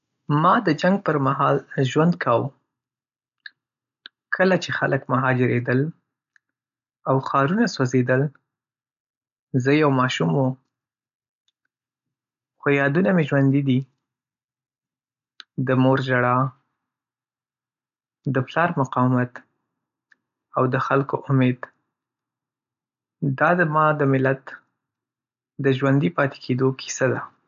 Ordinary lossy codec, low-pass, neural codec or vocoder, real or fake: none; 7.2 kHz; none; real